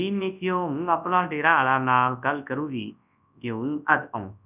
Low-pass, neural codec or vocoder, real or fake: 3.6 kHz; codec, 24 kHz, 0.9 kbps, WavTokenizer, large speech release; fake